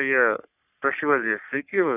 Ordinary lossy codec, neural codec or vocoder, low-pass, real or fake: none; codec, 44.1 kHz, 3.4 kbps, Pupu-Codec; 3.6 kHz; fake